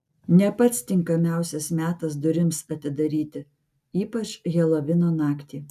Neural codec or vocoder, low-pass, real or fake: none; 14.4 kHz; real